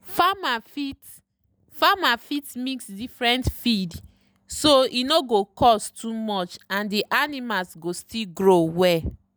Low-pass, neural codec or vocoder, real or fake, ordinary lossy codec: none; none; real; none